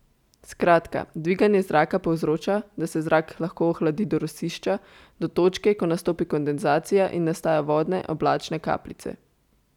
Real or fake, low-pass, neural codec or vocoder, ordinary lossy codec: real; 19.8 kHz; none; none